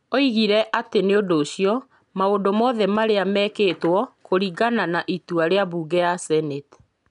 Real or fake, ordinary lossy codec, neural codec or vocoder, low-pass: real; none; none; 10.8 kHz